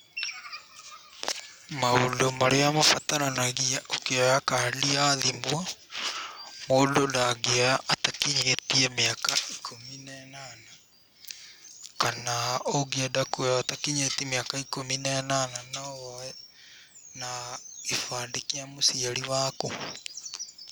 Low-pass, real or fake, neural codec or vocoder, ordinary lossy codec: none; real; none; none